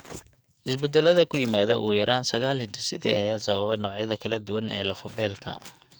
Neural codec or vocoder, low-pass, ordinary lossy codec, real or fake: codec, 44.1 kHz, 2.6 kbps, SNAC; none; none; fake